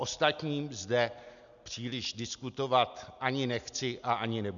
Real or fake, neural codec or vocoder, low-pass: real; none; 7.2 kHz